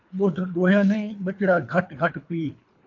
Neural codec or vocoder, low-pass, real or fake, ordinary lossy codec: codec, 24 kHz, 3 kbps, HILCodec; 7.2 kHz; fake; AAC, 48 kbps